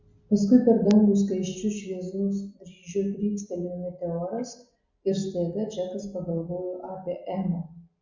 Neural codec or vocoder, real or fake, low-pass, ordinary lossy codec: none; real; 7.2 kHz; Opus, 64 kbps